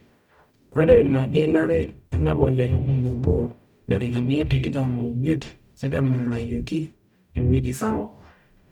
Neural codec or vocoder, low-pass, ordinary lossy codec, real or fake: codec, 44.1 kHz, 0.9 kbps, DAC; 19.8 kHz; none; fake